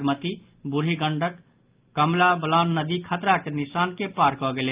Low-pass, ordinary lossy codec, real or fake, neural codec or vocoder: 3.6 kHz; Opus, 24 kbps; real; none